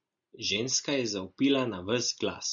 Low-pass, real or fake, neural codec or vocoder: 7.2 kHz; real; none